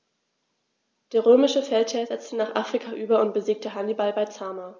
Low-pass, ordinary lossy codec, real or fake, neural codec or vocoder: none; none; real; none